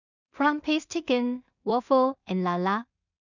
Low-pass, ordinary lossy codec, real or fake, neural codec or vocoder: 7.2 kHz; none; fake; codec, 16 kHz in and 24 kHz out, 0.4 kbps, LongCat-Audio-Codec, two codebook decoder